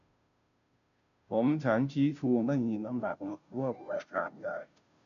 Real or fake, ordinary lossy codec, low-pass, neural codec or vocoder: fake; none; 7.2 kHz; codec, 16 kHz, 0.5 kbps, FunCodec, trained on Chinese and English, 25 frames a second